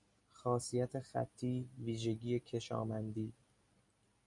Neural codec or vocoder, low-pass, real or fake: none; 10.8 kHz; real